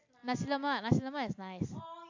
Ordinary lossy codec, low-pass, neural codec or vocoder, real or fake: AAC, 48 kbps; 7.2 kHz; none; real